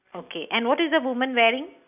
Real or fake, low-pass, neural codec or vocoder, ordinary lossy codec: real; 3.6 kHz; none; none